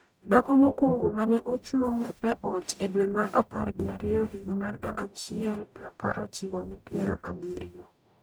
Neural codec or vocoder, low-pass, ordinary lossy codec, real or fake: codec, 44.1 kHz, 0.9 kbps, DAC; none; none; fake